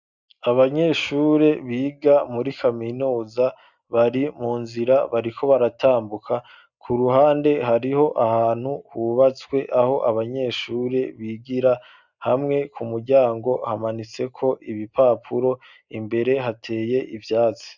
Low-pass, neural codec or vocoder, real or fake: 7.2 kHz; none; real